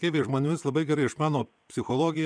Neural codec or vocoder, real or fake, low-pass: vocoder, 22.05 kHz, 80 mel bands, WaveNeXt; fake; 9.9 kHz